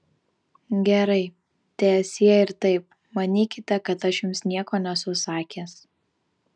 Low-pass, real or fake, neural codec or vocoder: 9.9 kHz; real; none